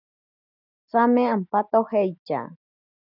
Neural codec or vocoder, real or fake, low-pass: none; real; 5.4 kHz